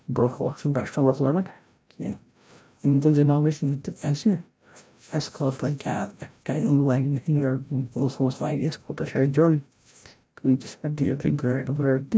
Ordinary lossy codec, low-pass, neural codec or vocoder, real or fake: none; none; codec, 16 kHz, 0.5 kbps, FreqCodec, larger model; fake